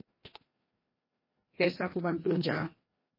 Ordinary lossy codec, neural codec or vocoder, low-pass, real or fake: MP3, 24 kbps; codec, 16 kHz, 1 kbps, FreqCodec, larger model; 5.4 kHz; fake